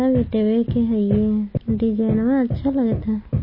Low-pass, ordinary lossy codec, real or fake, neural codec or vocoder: 5.4 kHz; MP3, 24 kbps; real; none